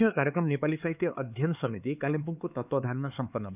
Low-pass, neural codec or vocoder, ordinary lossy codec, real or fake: 3.6 kHz; codec, 16 kHz, 4 kbps, X-Codec, HuBERT features, trained on LibriSpeech; none; fake